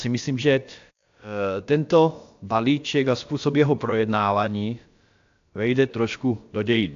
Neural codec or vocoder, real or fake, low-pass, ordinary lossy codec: codec, 16 kHz, about 1 kbps, DyCAST, with the encoder's durations; fake; 7.2 kHz; AAC, 64 kbps